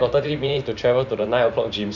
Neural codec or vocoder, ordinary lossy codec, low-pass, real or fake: vocoder, 44.1 kHz, 128 mel bands every 512 samples, BigVGAN v2; none; 7.2 kHz; fake